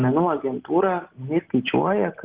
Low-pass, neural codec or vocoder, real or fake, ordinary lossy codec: 3.6 kHz; none; real; Opus, 16 kbps